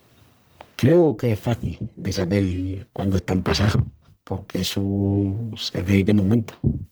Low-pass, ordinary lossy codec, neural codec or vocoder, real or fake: none; none; codec, 44.1 kHz, 1.7 kbps, Pupu-Codec; fake